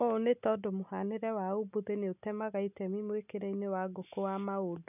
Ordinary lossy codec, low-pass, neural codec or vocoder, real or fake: none; 3.6 kHz; none; real